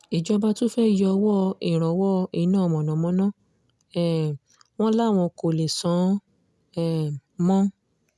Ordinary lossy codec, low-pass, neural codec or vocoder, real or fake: none; none; none; real